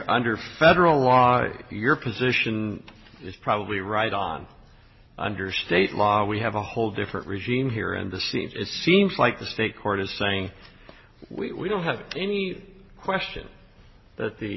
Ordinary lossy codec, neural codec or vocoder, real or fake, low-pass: MP3, 24 kbps; none; real; 7.2 kHz